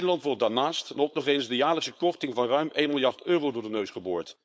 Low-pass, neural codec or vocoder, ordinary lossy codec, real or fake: none; codec, 16 kHz, 4.8 kbps, FACodec; none; fake